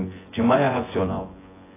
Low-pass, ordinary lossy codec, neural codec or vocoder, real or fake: 3.6 kHz; AAC, 16 kbps; vocoder, 24 kHz, 100 mel bands, Vocos; fake